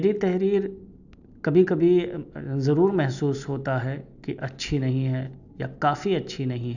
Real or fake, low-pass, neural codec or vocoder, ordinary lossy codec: real; 7.2 kHz; none; none